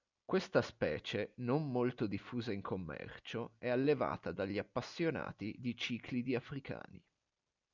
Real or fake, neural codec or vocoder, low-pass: real; none; 7.2 kHz